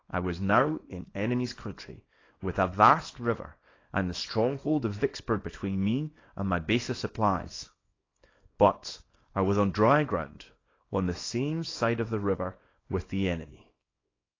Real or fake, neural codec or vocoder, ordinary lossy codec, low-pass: fake; codec, 24 kHz, 0.9 kbps, WavTokenizer, small release; AAC, 32 kbps; 7.2 kHz